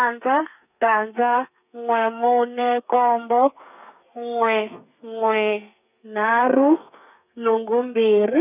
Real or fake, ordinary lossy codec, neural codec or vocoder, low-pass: fake; none; codec, 32 kHz, 1.9 kbps, SNAC; 3.6 kHz